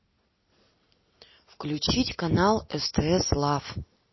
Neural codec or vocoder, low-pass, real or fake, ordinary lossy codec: none; 7.2 kHz; real; MP3, 24 kbps